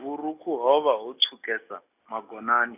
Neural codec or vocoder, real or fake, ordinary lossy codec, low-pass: none; real; none; 3.6 kHz